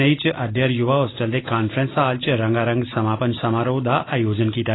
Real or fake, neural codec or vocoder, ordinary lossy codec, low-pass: real; none; AAC, 16 kbps; 7.2 kHz